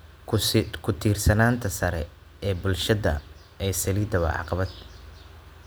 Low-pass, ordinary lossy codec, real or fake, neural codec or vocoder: none; none; real; none